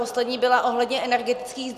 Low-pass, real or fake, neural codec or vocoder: 14.4 kHz; real; none